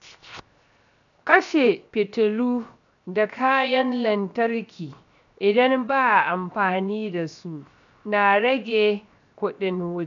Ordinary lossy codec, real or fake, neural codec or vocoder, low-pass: none; fake; codec, 16 kHz, 0.7 kbps, FocalCodec; 7.2 kHz